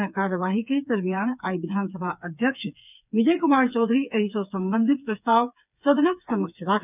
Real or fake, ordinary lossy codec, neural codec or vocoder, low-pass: fake; none; codec, 16 kHz, 4 kbps, FreqCodec, smaller model; 3.6 kHz